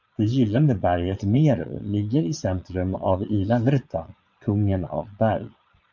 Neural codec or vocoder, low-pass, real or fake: vocoder, 22.05 kHz, 80 mel bands, Vocos; 7.2 kHz; fake